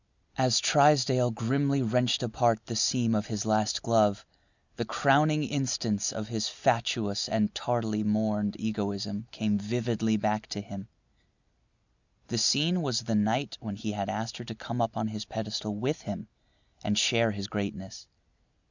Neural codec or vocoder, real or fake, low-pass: none; real; 7.2 kHz